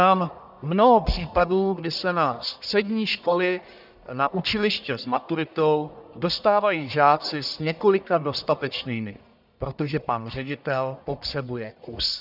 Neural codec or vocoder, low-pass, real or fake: codec, 44.1 kHz, 1.7 kbps, Pupu-Codec; 5.4 kHz; fake